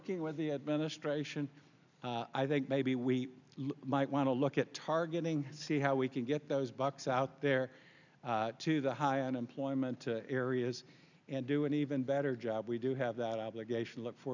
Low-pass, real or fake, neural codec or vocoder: 7.2 kHz; real; none